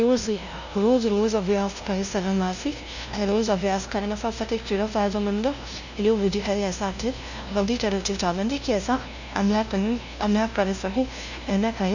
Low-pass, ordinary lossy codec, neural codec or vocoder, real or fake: 7.2 kHz; none; codec, 16 kHz, 0.5 kbps, FunCodec, trained on LibriTTS, 25 frames a second; fake